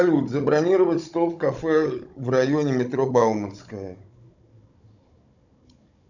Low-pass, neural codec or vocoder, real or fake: 7.2 kHz; codec, 16 kHz, 16 kbps, FunCodec, trained on Chinese and English, 50 frames a second; fake